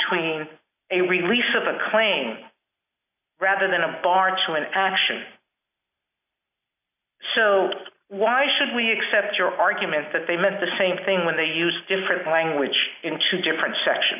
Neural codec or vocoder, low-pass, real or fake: none; 3.6 kHz; real